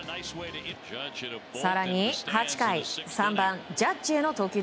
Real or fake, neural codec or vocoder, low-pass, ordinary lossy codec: real; none; none; none